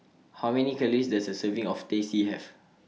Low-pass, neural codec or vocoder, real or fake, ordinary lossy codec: none; none; real; none